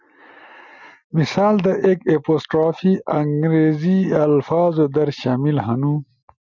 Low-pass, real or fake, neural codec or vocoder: 7.2 kHz; real; none